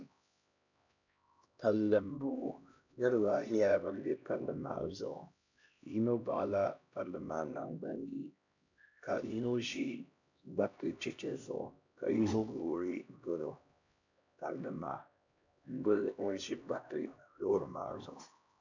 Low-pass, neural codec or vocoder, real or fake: 7.2 kHz; codec, 16 kHz, 1 kbps, X-Codec, HuBERT features, trained on LibriSpeech; fake